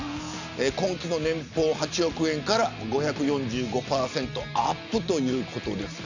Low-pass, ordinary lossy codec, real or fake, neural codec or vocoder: 7.2 kHz; none; real; none